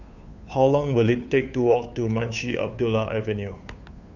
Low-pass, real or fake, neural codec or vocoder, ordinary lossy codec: 7.2 kHz; fake; codec, 16 kHz, 2 kbps, FunCodec, trained on Chinese and English, 25 frames a second; none